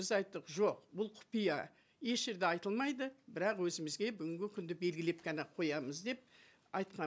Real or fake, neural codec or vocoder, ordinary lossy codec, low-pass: real; none; none; none